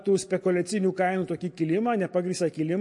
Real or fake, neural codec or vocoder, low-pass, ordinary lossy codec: real; none; 10.8 kHz; MP3, 48 kbps